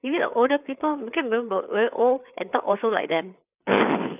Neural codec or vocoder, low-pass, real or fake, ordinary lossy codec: codec, 16 kHz, 4 kbps, FreqCodec, larger model; 3.6 kHz; fake; none